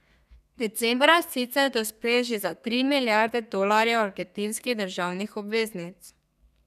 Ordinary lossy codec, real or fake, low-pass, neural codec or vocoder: none; fake; 14.4 kHz; codec, 32 kHz, 1.9 kbps, SNAC